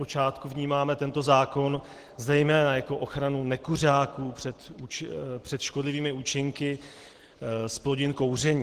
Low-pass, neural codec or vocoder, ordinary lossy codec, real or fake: 14.4 kHz; none; Opus, 16 kbps; real